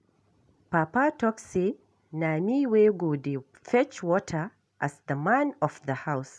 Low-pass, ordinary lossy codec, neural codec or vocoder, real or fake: none; none; none; real